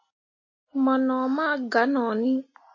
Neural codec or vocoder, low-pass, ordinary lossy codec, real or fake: none; 7.2 kHz; MP3, 32 kbps; real